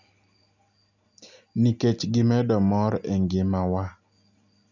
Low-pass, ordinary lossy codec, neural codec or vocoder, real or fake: 7.2 kHz; none; none; real